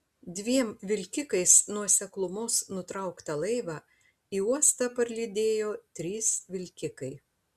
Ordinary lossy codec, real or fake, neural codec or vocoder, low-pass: Opus, 64 kbps; real; none; 14.4 kHz